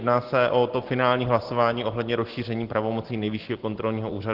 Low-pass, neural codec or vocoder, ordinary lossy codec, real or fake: 5.4 kHz; none; Opus, 16 kbps; real